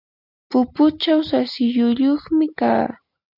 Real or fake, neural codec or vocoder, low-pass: real; none; 5.4 kHz